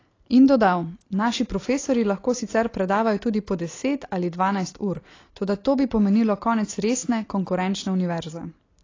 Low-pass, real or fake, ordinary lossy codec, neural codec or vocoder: 7.2 kHz; real; AAC, 32 kbps; none